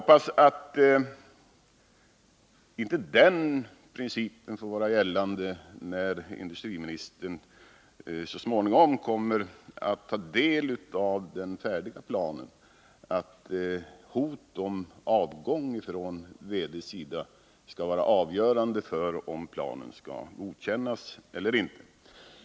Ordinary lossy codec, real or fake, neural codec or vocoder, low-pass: none; real; none; none